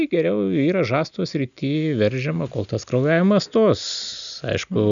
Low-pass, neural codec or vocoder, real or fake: 7.2 kHz; none; real